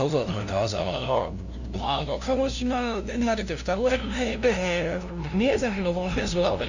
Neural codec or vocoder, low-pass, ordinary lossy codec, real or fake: codec, 16 kHz, 0.5 kbps, FunCodec, trained on LibriTTS, 25 frames a second; 7.2 kHz; none; fake